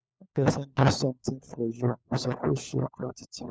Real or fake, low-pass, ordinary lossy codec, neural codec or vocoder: fake; none; none; codec, 16 kHz, 4 kbps, FunCodec, trained on LibriTTS, 50 frames a second